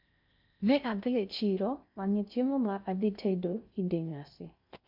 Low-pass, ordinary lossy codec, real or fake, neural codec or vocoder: 5.4 kHz; MP3, 48 kbps; fake; codec, 16 kHz in and 24 kHz out, 0.6 kbps, FocalCodec, streaming, 2048 codes